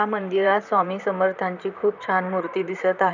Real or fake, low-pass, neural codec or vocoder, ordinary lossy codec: fake; 7.2 kHz; vocoder, 44.1 kHz, 128 mel bands, Pupu-Vocoder; none